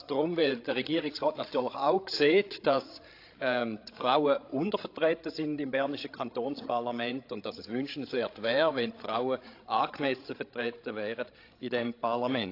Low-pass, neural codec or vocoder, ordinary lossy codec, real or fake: 5.4 kHz; codec, 16 kHz, 16 kbps, FreqCodec, larger model; AAC, 32 kbps; fake